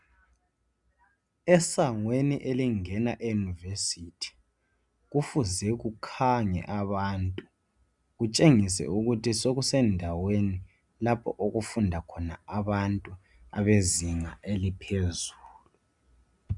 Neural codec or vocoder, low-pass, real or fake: none; 10.8 kHz; real